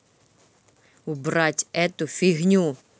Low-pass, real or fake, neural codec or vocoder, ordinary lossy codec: none; real; none; none